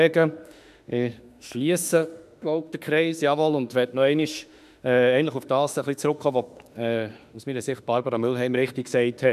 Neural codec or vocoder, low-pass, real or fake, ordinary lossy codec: autoencoder, 48 kHz, 32 numbers a frame, DAC-VAE, trained on Japanese speech; 14.4 kHz; fake; none